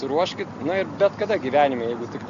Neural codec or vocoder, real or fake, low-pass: none; real; 7.2 kHz